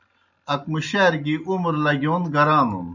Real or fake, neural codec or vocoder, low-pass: real; none; 7.2 kHz